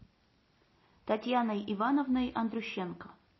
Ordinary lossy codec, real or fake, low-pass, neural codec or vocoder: MP3, 24 kbps; real; 7.2 kHz; none